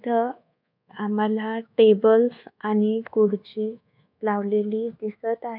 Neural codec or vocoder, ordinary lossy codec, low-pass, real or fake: codec, 24 kHz, 1.2 kbps, DualCodec; none; 5.4 kHz; fake